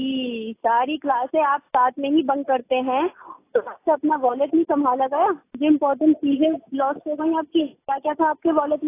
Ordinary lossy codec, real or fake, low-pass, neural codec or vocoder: AAC, 24 kbps; real; 3.6 kHz; none